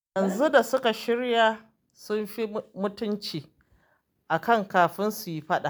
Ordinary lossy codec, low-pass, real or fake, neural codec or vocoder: none; none; real; none